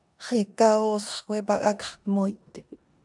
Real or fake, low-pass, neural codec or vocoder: fake; 10.8 kHz; codec, 16 kHz in and 24 kHz out, 0.9 kbps, LongCat-Audio-Codec, four codebook decoder